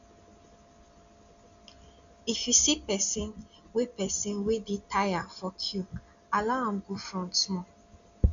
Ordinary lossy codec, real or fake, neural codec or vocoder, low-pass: none; real; none; 7.2 kHz